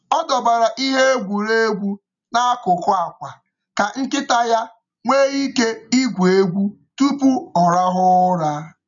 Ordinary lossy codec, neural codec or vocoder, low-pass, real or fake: none; none; 7.2 kHz; real